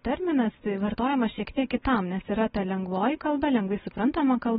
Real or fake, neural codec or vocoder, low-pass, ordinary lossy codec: real; none; 19.8 kHz; AAC, 16 kbps